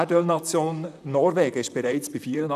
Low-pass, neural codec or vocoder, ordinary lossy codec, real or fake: 14.4 kHz; vocoder, 44.1 kHz, 128 mel bands, Pupu-Vocoder; none; fake